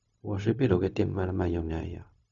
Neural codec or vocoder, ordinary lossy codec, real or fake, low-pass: codec, 16 kHz, 0.4 kbps, LongCat-Audio-Codec; none; fake; 7.2 kHz